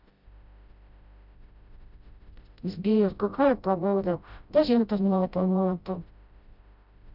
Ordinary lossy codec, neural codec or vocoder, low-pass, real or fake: none; codec, 16 kHz, 0.5 kbps, FreqCodec, smaller model; 5.4 kHz; fake